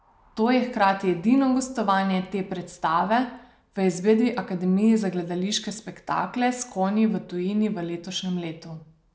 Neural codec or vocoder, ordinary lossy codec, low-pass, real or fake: none; none; none; real